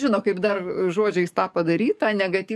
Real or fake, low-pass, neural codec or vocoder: fake; 14.4 kHz; codec, 44.1 kHz, 7.8 kbps, DAC